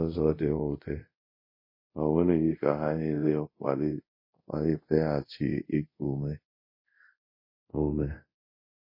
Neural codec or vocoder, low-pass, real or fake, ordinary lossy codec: codec, 24 kHz, 0.5 kbps, DualCodec; 5.4 kHz; fake; MP3, 24 kbps